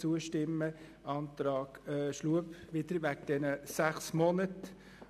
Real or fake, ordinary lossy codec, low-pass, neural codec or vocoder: real; none; 14.4 kHz; none